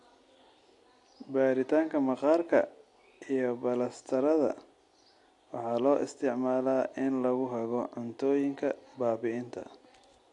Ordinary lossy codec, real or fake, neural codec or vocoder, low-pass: AAC, 48 kbps; real; none; 10.8 kHz